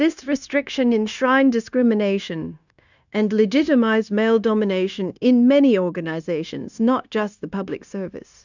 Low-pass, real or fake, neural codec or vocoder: 7.2 kHz; fake; codec, 16 kHz, 0.9 kbps, LongCat-Audio-Codec